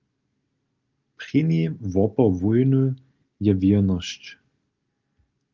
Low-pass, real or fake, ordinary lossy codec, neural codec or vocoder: 7.2 kHz; real; Opus, 32 kbps; none